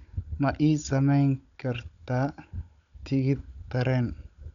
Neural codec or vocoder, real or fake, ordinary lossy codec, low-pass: codec, 16 kHz, 16 kbps, FunCodec, trained on Chinese and English, 50 frames a second; fake; none; 7.2 kHz